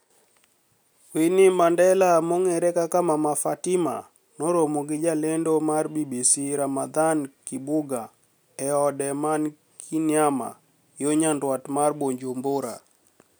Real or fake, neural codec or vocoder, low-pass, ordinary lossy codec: real; none; none; none